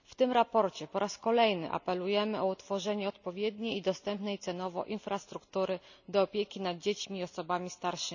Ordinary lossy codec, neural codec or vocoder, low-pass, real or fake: none; none; 7.2 kHz; real